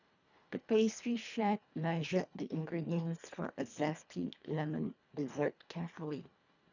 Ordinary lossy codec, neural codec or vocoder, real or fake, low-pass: none; codec, 24 kHz, 1.5 kbps, HILCodec; fake; 7.2 kHz